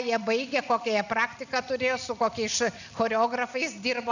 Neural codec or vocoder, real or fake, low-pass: none; real; 7.2 kHz